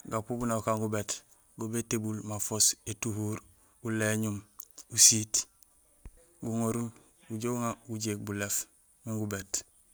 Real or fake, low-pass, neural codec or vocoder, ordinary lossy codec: real; none; none; none